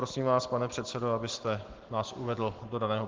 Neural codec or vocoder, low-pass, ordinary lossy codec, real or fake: none; 7.2 kHz; Opus, 16 kbps; real